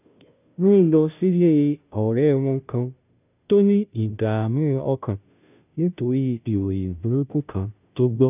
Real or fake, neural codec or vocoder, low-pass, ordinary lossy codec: fake; codec, 16 kHz, 0.5 kbps, FunCodec, trained on Chinese and English, 25 frames a second; 3.6 kHz; none